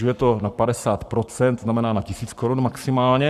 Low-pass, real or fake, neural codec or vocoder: 14.4 kHz; fake; codec, 44.1 kHz, 7.8 kbps, Pupu-Codec